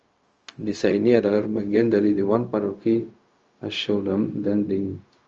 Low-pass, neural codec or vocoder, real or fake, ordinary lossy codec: 7.2 kHz; codec, 16 kHz, 0.4 kbps, LongCat-Audio-Codec; fake; Opus, 24 kbps